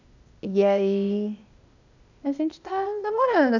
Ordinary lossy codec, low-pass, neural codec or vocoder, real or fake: none; 7.2 kHz; codec, 16 kHz, 0.8 kbps, ZipCodec; fake